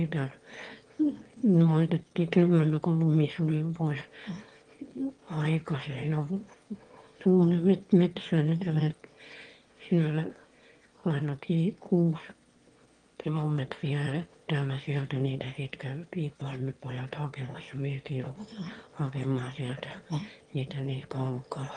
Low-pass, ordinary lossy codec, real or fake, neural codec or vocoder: 9.9 kHz; Opus, 24 kbps; fake; autoencoder, 22.05 kHz, a latent of 192 numbers a frame, VITS, trained on one speaker